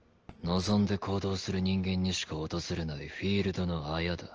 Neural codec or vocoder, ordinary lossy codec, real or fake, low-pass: none; Opus, 16 kbps; real; 7.2 kHz